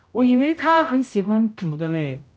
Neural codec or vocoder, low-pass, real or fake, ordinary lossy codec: codec, 16 kHz, 0.5 kbps, X-Codec, HuBERT features, trained on general audio; none; fake; none